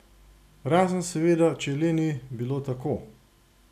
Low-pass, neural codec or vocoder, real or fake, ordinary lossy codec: 14.4 kHz; none; real; none